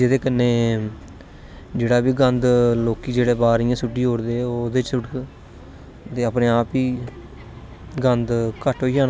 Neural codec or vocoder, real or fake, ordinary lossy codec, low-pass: none; real; none; none